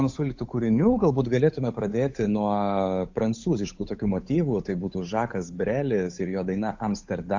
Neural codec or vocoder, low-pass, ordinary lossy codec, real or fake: codec, 16 kHz, 8 kbps, FunCodec, trained on Chinese and English, 25 frames a second; 7.2 kHz; AAC, 48 kbps; fake